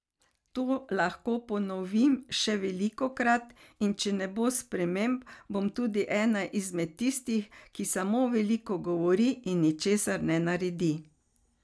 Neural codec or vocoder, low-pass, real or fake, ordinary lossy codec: none; none; real; none